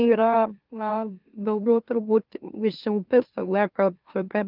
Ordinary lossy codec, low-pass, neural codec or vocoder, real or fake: Opus, 24 kbps; 5.4 kHz; autoencoder, 44.1 kHz, a latent of 192 numbers a frame, MeloTTS; fake